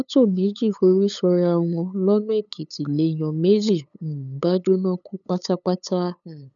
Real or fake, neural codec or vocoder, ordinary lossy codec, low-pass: fake; codec, 16 kHz, 8 kbps, FunCodec, trained on LibriTTS, 25 frames a second; none; 7.2 kHz